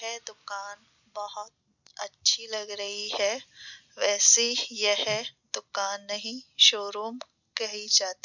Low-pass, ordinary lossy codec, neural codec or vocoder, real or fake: 7.2 kHz; none; none; real